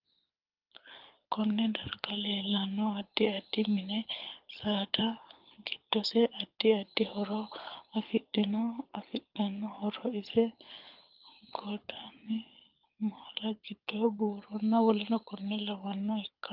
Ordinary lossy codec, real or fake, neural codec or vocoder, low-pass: Opus, 24 kbps; fake; codec, 24 kHz, 6 kbps, HILCodec; 5.4 kHz